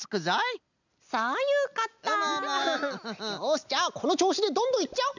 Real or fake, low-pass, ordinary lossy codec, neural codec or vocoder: real; 7.2 kHz; none; none